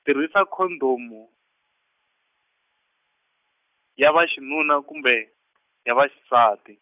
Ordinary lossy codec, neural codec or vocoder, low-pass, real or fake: none; none; 3.6 kHz; real